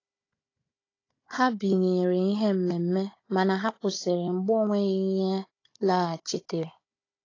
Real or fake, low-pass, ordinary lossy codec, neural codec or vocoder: fake; 7.2 kHz; AAC, 32 kbps; codec, 16 kHz, 16 kbps, FunCodec, trained on Chinese and English, 50 frames a second